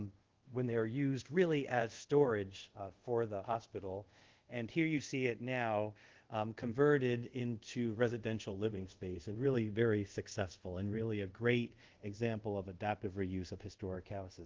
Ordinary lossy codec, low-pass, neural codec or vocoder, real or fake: Opus, 16 kbps; 7.2 kHz; codec, 24 kHz, 0.5 kbps, DualCodec; fake